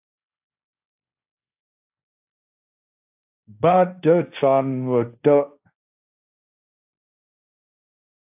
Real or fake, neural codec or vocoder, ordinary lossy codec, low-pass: fake; codec, 16 kHz, 1.1 kbps, Voila-Tokenizer; AAC, 32 kbps; 3.6 kHz